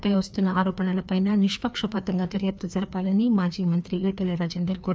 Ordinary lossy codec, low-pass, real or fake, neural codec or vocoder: none; none; fake; codec, 16 kHz, 2 kbps, FreqCodec, larger model